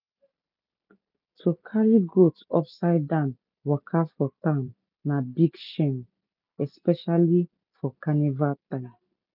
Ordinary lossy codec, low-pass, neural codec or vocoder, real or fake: none; 5.4 kHz; none; real